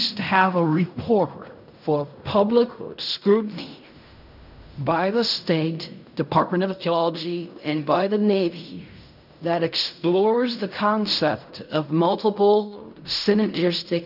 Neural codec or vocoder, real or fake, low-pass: codec, 16 kHz in and 24 kHz out, 0.4 kbps, LongCat-Audio-Codec, fine tuned four codebook decoder; fake; 5.4 kHz